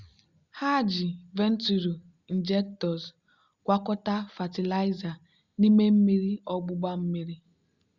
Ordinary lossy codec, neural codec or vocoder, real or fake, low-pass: Opus, 64 kbps; none; real; 7.2 kHz